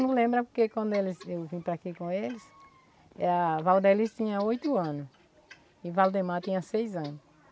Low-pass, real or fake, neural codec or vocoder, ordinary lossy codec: none; real; none; none